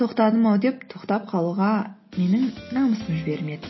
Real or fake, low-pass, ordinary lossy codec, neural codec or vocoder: real; 7.2 kHz; MP3, 24 kbps; none